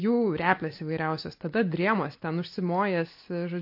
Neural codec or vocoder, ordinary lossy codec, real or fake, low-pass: none; MP3, 32 kbps; real; 5.4 kHz